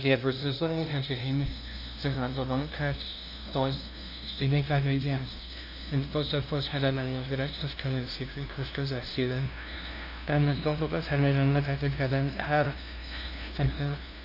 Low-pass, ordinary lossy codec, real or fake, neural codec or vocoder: 5.4 kHz; none; fake; codec, 16 kHz, 0.5 kbps, FunCodec, trained on LibriTTS, 25 frames a second